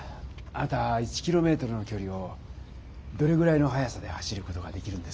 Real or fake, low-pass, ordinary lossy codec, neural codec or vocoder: real; none; none; none